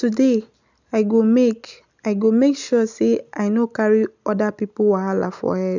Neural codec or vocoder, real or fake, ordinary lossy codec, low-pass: none; real; none; 7.2 kHz